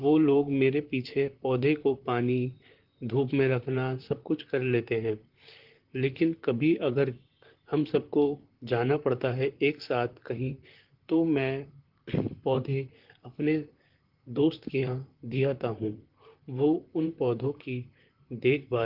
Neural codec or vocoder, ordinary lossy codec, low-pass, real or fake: vocoder, 44.1 kHz, 128 mel bands, Pupu-Vocoder; Opus, 16 kbps; 5.4 kHz; fake